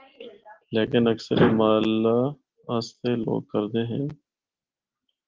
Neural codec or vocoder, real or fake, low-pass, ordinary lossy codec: none; real; 7.2 kHz; Opus, 16 kbps